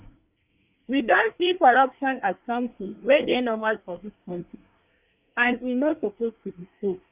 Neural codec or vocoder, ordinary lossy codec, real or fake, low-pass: codec, 24 kHz, 1 kbps, SNAC; Opus, 64 kbps; fake; 3.6 kHz